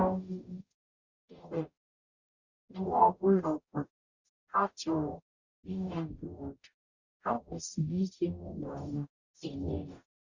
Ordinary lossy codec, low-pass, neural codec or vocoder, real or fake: Opus, 64 kbps; 7.2 kHz; codec, 44.1 kHz, 0.9 kbps, DAC; fake